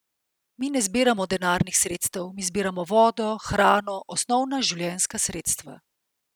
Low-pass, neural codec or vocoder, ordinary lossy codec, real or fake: none; none; none; real